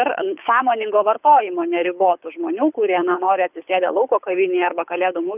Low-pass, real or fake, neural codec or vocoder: 3.6 kHz; fake; codec, 24 kHz, 6 kbps, HILCodec